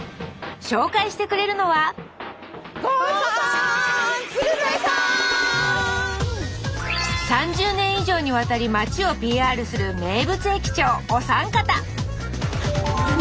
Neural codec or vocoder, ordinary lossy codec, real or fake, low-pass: none; none; real; none